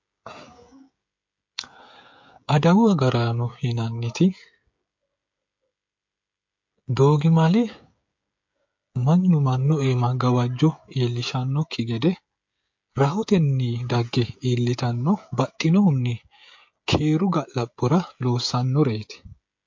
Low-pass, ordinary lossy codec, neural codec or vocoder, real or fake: 7.2 kHz; MP3, 48 kbps; codec, 16 kHz, 16 kbps, FreqCodec, smaller model; fake